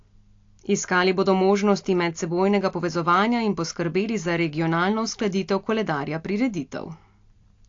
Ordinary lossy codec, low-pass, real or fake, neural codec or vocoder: AAC, 48 kbps; 7.2 kHz; real; none